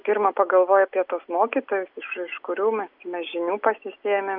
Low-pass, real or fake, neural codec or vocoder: 5.4 kHz; real; none